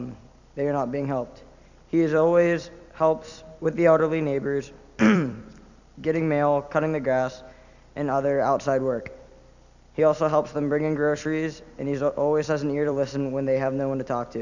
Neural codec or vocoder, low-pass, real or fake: vocoder, 44.1 kHz, 128 mel bands every 256 samples, BigVGAN v2; 7.2 kHz; fake